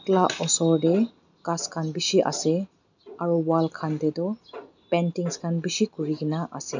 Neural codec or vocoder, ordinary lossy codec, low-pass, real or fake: none; none; 7.2 kHz; real